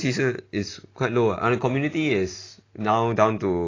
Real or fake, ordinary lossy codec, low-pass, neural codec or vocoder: real; AAC, 32 kbps; 7.2 kHz; none